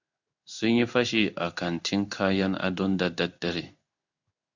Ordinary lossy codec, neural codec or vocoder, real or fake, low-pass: Opus, 64 kbps; codec, 16 kHz in and 24 kHz out, 1 kbps, XY-Tokenizer; fake; 7.2 kHz